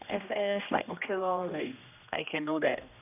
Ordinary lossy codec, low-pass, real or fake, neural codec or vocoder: none; 3.6 kHz; fake; codec, 16 kHz, 1 kbps, X-Codec, HuBERT features, trained on general audio